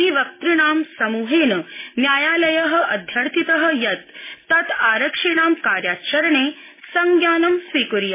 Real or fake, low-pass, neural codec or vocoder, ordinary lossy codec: real; 3.6 kHz; none; MP3, 16 kbps